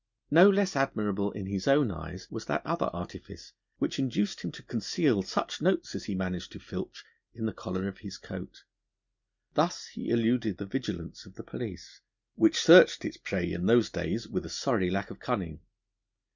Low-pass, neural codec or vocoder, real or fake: 7.2 kHz; none; real